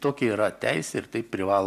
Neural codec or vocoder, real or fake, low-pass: none; real; 14.4 kHz